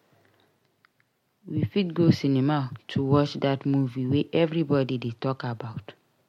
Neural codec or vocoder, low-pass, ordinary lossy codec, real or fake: none; 19.8 kHz; MP3, 64 kbps; real